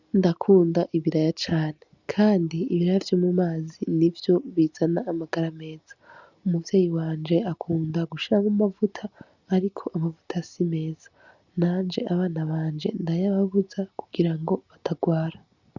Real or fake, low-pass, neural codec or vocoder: real; 7.2 kHz; none